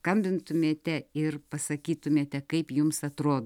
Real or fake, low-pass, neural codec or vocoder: real; 19.8 kHz; none